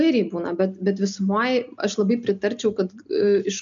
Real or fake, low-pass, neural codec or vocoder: real; 7.2 kHz; none